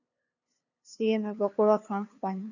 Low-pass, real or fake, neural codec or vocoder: 7.2 kHz; fake; codec, 16 kHz, 2 kbps, FunCodec, trained on LibriTTS, 25 frames a second